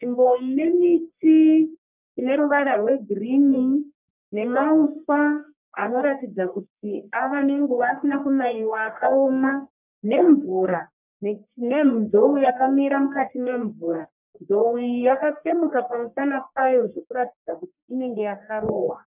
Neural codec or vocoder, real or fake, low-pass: codec, 44.1 kHz, 1.7 kbps, Pupu-Codec; fake; 3.6 kHz